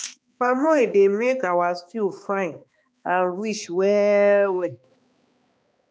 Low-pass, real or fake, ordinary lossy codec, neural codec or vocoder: none; fake; none; codec, 16 kHz, 2 kbps, X-Codec, HuBERT features, trained on balanced general audio